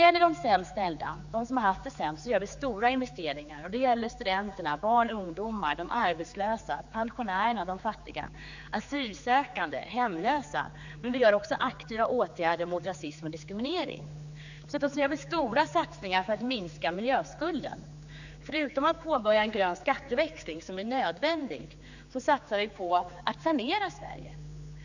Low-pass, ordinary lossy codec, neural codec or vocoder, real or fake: 7.2 kHz; none; codec, 16 kHz, 4 kbps, X-Codec, HuBERT features, trained on general audio; fake